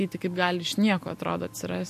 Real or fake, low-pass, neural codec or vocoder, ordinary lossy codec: real; 14.4 kHz; none; MP3, 64 kbps